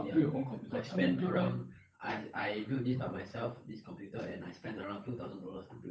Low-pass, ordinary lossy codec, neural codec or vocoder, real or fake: none; none; codec, 16 kHz, 8 kbps, FunCodec, trained on Chinese and English, 25 frames a second; fake